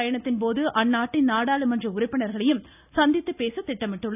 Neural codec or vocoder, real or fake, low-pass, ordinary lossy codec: none; real; 3.6 kHz; none